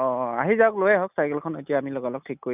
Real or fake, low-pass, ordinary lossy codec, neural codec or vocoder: real; 3.6 kHz; none; none